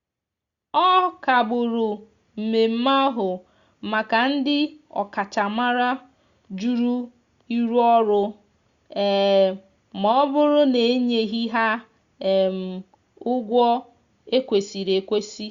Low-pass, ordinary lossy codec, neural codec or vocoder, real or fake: 7.2 kHz; Opus, 64 kbps; none; real